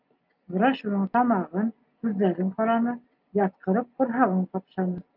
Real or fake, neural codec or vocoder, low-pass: real; none; 5.4 kHz